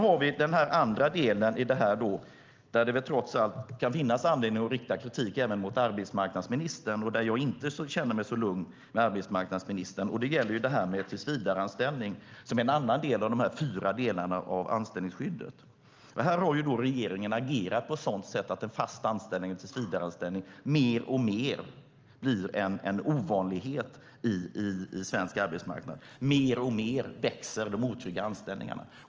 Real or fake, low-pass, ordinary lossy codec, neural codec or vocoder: real; 7.2 kHz; Opus, 24 kbps; none